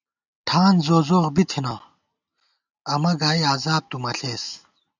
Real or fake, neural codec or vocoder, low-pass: real; none; 7.2 kHz